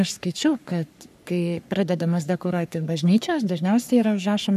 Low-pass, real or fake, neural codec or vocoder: 14.4 kHz; fake; codec, 44.1 kHz, 3.4 kbps, Pupu-Codec